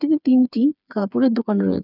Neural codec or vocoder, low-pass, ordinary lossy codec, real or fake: codec, 16 kHz, 4 kbps, FreqCodec, smaller model; 5.4 kHz; none; fake